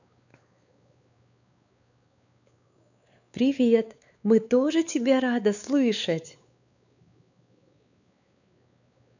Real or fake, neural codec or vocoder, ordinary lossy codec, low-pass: fake; codec, 16 kHz, 4 kbps, X-Codec, WavLM features, trained on Multilingual LibriSpeech; none; 7.2 kHz